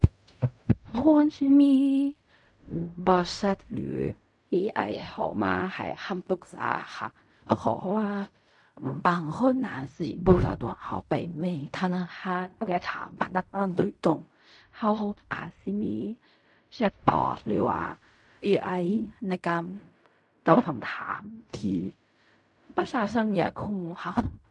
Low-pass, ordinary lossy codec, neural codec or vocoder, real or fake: 10.8 kHz; MP3, 96 kbps; codec, 16 kHz in and 24 kHz out, 0.4 kbps, LongCat-Audio-Codec, fine tuned four codebook decoder; fake